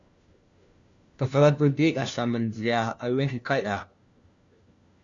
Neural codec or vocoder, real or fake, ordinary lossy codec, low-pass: codec, 16 kHz, 1 kbps, FunCodec, trained on LibriTTS, 50 frames a second; fake; Opus, 64 kbps; 7.2 kHz